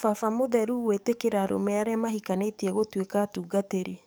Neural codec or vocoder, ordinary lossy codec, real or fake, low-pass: codec, 44.1 kHz, 7.8 kbps, DAC; none; fake; none